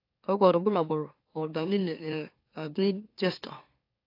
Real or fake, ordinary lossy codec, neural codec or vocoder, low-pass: fake; AAC, 32 kbps; autoencoder, 44.1 kHz, a latent of 192 numbers a frame, MeloTTS; 5.4 kHz